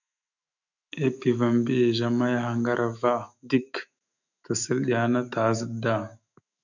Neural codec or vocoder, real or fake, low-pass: autoencoder, 48 kHz, 128 numbers a frame, DAC-VAE, trained on Japanese speech; fake; 7.2 kHz